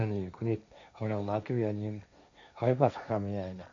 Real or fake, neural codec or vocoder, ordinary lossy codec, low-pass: fake; codec, 16 kHz, 1.1 kbps, Voila-Tokenizer; AAC, 64 kbps; 7.2 kHz